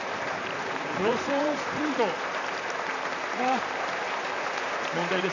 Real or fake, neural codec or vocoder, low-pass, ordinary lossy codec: fake; vocoder, 44.1 kHz, 128 mel bands, Pupu-Vocoder; 7.2 kHz; none